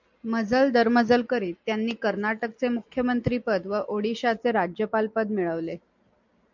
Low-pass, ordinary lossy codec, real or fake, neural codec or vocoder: 7.2 kHz; MP3, 64 kbps; real; none